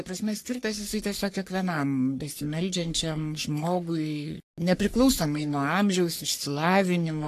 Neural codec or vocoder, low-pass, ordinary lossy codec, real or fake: codec, 44.1 kHz, 3.4 kbps, Pupu-Codec; 14.4 kHz; AAC, 64 kbps; fake